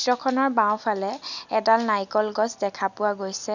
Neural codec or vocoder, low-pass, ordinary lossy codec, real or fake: none; 7.2 kHz; none; real